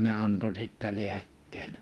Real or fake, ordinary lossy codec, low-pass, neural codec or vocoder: fake; Opus, 32 kbps; 19.8 kHz; autoencoder, 48 kHz, 32 numbers a frame, DAC-VAE, trained on Japanese speech